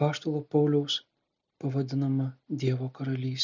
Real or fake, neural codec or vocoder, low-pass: real; none; 7.2 kHz